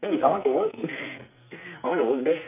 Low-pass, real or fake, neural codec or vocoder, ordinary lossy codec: 3.6 kHz; fake; codec, 44.1 kHz, 2.6 kbps, SNAC; none